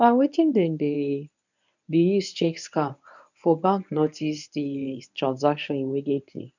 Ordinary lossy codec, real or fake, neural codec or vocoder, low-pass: none; fake; codec, 24 kHz, 0.9 kbps, WavTokenizer, medium speech release version 1; 7.2 kHz